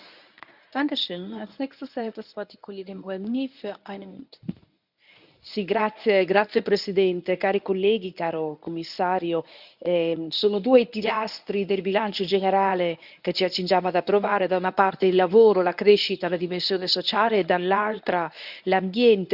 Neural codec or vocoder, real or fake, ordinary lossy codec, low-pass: codec, 24 kHz, 0.9 kbps, WavTokenizer, medium speech release version 1; fake; none; 5.4 kHz